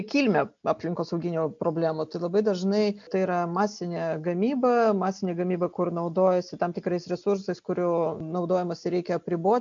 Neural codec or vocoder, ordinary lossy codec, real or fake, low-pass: none; MP3, 64 kbps; real; 7.2 kHz